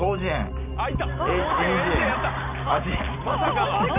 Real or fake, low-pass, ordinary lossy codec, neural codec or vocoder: real; 3.6 kHz; none; none